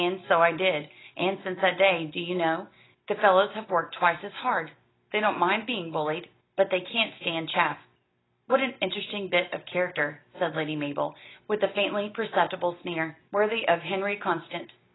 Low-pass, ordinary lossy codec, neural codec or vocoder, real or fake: 7.2 kHz; AAC, 16 kbps; none; real